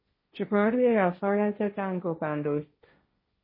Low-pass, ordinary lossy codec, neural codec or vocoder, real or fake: 5.4 kHz; MP3, 24 kbps; codec, 16 kHz, 1.1 kbps, Voila-Tokenizer; fake